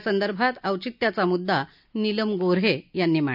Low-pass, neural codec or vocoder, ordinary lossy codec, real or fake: 5.4 kHz; none; none; real